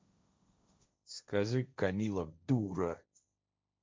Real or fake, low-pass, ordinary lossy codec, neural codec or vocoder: fake; none; none; codec, 16 kHz, 1.1 kbps, Voila-Tokenizer